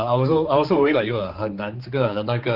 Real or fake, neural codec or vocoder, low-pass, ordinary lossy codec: fake; codec, 16 kHz, 4 kbps, X-Codec, HuBERT features, trained on general audio; 5.4 kHz; Opus, 32 kbps